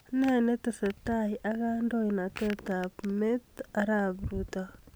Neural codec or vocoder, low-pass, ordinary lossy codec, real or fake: none; none; none; real